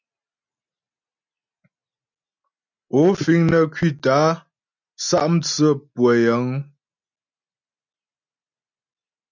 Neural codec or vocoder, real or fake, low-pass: none; real; 7.2 kHz